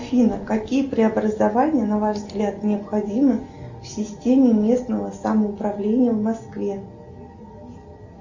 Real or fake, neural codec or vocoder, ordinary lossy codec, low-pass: real; none; Opus, 64 kbps; 7.2 kHz